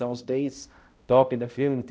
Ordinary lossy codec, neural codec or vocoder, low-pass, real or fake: none; codec, 16 kHz, 0.5 kbps, X-Codec, HuBERT features, trained on balanced general audio; none; fake